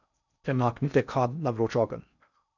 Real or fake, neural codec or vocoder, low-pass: fake; codec, 16 kHz in and 24 kHz out, 0.6 kbps, FocalCodec, streaming, 4096 codes; 7.2 kHz